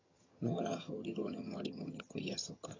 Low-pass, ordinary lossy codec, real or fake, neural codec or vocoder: 7.2 kHz; AAC, 32 kbps; fake; vocoder, 22.05 kHz, 80 mel bands, HiFi-GAN